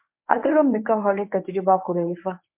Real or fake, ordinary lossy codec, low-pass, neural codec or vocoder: fake; MP3, 32 kbps; 3.6 kHz; codec, 24 kHz, 0.9 kbps, WavTokenizer, medium speech release version 1